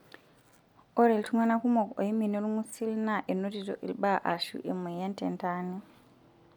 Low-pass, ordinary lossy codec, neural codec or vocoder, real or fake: 19.8 kHz; none; none; real